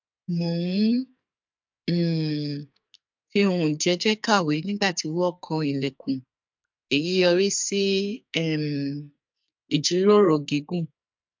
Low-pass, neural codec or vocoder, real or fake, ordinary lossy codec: 7.2 kHz; codec, 44.1 kHz, 2.6 kbps, SNAC; fake; MP3, 64 kbps